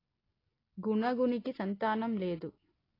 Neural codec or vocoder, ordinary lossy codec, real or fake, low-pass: none; AAC, 24 kbps; real; 5.4 kHz